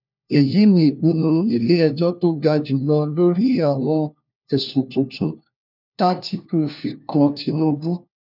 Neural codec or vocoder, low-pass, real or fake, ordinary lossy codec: codec, 16 kHz, 1 kbps, FunCodec, trained on LibriTTS, 50 frames a second; 5.4 kHz; fake; none